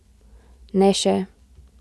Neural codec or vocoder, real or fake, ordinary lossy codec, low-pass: none; real; none; none